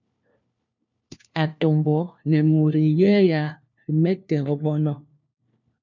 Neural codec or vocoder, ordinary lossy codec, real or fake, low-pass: codec, 16 kHz, 1 kbps, FunCodec, trained on LibriTTS, 50 frames a second; MP3, 64 kbps; fake; 7.2 kHz